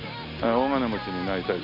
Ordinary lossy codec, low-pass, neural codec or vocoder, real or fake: MP3, 48 kbps; 5.4 kHz; none; real